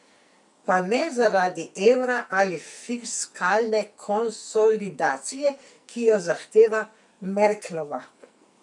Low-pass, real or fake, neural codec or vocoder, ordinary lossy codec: 10.8 kHz; fake; codec, 44.1 kHz, 2.6 kbps, SNAC; none